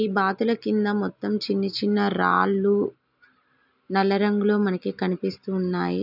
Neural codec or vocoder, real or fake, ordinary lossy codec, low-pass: none; real; none; 5.4 kHz